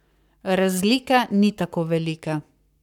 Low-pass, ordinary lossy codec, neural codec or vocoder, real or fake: 19.8 kHz; none; codec, 44.1 kHz, 7.8 kbps, Pupu-Codec; fake